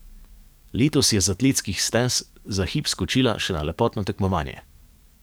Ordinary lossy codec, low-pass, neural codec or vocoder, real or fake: none; none; codec, 44.1 kHz, 7.8 kbps, DAC; fake